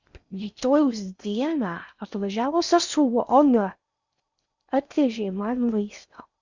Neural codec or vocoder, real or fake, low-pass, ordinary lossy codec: codec, 16 kHz in and 24 kHz out, 0.6 kbps, FocalCodec, streaming, 4096 codes; fake; 7.2 kHz; Opus, 64 kbps